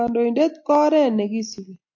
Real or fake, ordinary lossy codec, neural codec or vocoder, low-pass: real; AAC, 48 kbps; none; 7.2 kHz